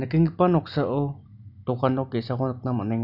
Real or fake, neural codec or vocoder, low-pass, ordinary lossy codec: real; none; 5.4 kHz; none